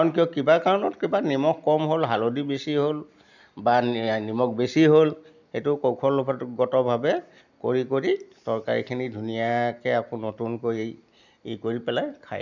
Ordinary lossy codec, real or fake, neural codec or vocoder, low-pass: none; real; none; 7.2 kHz